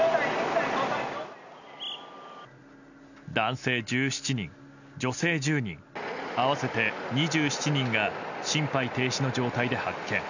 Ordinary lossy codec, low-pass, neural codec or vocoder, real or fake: none; 7.2 kHz; none; real